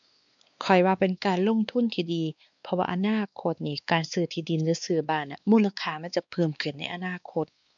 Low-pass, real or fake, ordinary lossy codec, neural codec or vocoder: 7.2 kHz; fake; none; codec, 16 kHz, 2 kbps, X-Codec, WavLM features, trained on Multilingual LibriSpeech